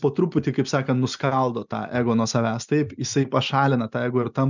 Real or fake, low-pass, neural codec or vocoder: real; 7.2 kHz; none